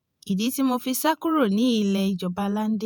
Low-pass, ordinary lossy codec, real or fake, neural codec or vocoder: none; none; fake; vocoder, 48 kHz, 128 mel bands, Vocos